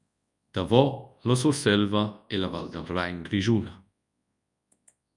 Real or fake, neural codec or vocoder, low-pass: fake; codec, 24 kHz, 0.9 kbps, WavTokenizer, large speech release; 10.8 kHz